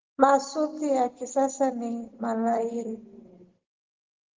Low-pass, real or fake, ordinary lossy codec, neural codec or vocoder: 7.2 kHz; real; Opus, 16 kbps; none